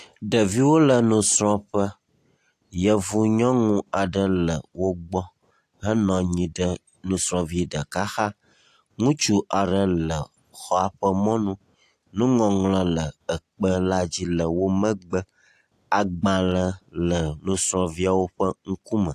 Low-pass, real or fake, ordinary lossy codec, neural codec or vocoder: 14.4 kHz; real; AAC, 64 kbps; none